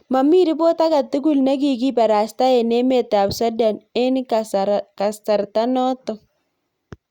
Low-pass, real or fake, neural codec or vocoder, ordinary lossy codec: 19.8 kHz; real; none; Opus, 64 kbps